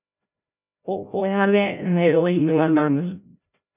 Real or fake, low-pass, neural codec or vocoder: fake; 3.6 kHz; codec, 16 kHz, 0.5 kbps, FreqCodec, larger model